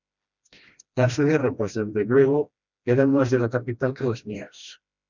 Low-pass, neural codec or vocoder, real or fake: 7.2 kHz; codec, 16 kHz, 1 kbps, FreqCodec, smaller model; fake